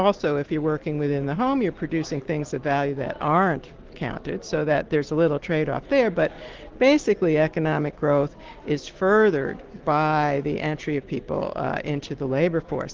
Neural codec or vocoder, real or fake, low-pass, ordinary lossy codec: none; real; 7.2 kHz; Opus, 16 kbps